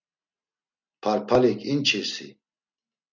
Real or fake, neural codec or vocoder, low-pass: real; none; 7.2 kHz